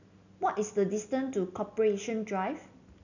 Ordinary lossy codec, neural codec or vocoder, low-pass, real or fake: none; none; 7.2 kHz; real